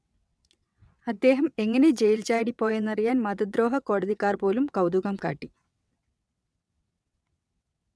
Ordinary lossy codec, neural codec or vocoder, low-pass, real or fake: none; vocoder, 22.05 kHz, 80 mel bands, WaveNeXt; none; fake